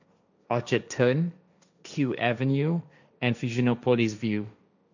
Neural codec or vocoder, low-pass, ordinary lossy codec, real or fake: codec, 16 kHz, 1.1 kbps, Voila-Tokenizer; 7.2 kHz; none; fake